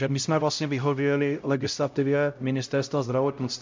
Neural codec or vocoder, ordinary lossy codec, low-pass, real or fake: codec, 16 kHz, 0.5 kbps, X-Codec, HuBERT features, trained on LibriSpeech; MP3, 64 kbps; 7.2 kHz; fake